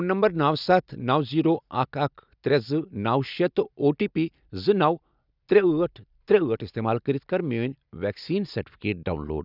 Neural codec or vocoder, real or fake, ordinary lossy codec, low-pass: none; real; none; 5.4 kHz